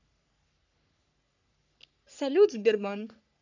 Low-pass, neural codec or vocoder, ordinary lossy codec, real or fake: 7.2 kHz; codec, 44.1 kHz, 3.4 kbps, Pupu-Codec; none; fake